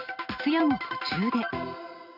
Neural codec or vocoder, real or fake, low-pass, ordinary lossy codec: none; real; 5.4 kHz; none